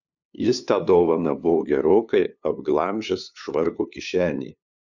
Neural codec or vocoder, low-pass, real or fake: codec, 16 kHz, 2 kbps, FunCodec, trained on LibriTTS, 25 frames a second; 7.2 kHz; fake